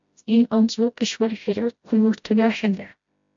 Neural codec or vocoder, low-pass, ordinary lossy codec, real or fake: codec, 16 kHz, 0.5 kbps, FreqCodec, smaller model; 7.2 kHz; AAC, 64 kbps; fake